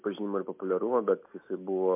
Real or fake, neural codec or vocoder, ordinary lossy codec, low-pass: real; none; MP3, 24 kbps; 3.6 kHz